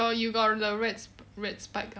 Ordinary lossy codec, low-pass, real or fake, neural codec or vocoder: none; none; real; none